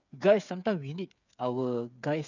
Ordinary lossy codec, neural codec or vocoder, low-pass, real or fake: none; codec, 16 kHz, 8 kbps, FreqCodec, smaller model; 7.2 kHz; fake